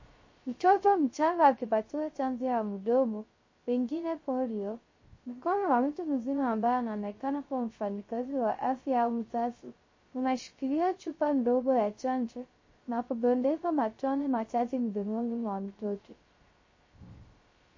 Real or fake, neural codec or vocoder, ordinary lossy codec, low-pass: fake; codec, 16 kHz, 0.3 kbps, FocalCodec; MP3, 32 kbps; 7.2 kHz